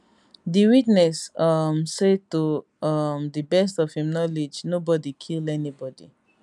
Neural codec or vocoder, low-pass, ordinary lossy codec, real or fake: none; 10.8 kHz; none; real